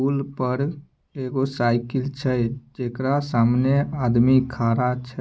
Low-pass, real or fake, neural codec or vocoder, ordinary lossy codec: none; real; none; none